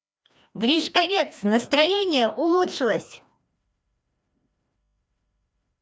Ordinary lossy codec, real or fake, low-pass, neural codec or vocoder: none; fake; none; codec, 16 kHz, 1 kbps, FreqCodec, larger model